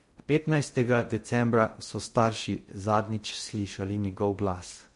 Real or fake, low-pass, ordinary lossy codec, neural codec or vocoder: fake; 10.8 kHz; MP3, 48 kbps; codec, 16 kHz in and 24 kHz out, 0.6 kbps, FocalCodec, streaming, 2048 codes